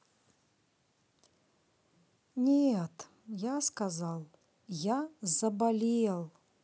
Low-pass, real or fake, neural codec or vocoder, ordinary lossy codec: none; real; none; none